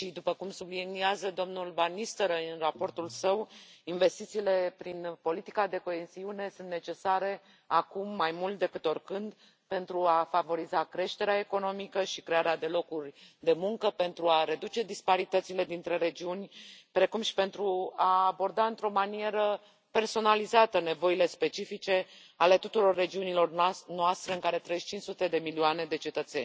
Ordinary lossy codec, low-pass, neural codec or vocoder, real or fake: none; none; none; real